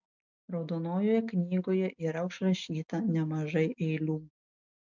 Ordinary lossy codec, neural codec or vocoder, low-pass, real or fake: MP3, 64 kbps; none; 7.2 kHz; real